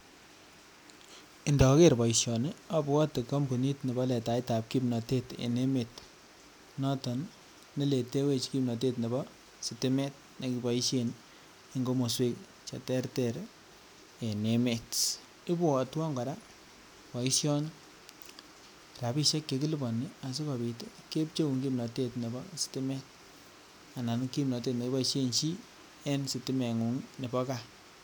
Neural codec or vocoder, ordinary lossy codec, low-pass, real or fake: none; none; none; real